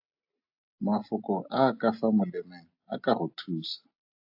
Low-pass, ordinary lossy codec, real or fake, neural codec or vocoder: 5.4 kHz; AAC, 48 kbps; real; none